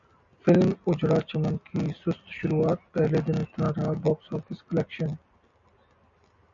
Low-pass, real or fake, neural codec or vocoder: 7.2 kHz; real; none